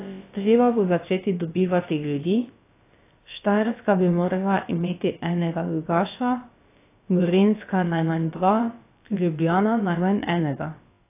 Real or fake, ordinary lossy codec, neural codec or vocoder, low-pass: fake; AAC, 24 kbps; codec, 16 kHz, about 1 kbps, DyCAST, with the encoder's durations; 3.6 kHz